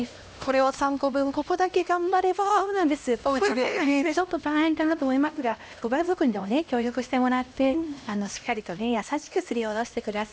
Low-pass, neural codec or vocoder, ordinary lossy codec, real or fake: none; codec, 16 kHz, 1 kbps, X-Codec, HuBERT features, trained on LibriSpeech; none; fake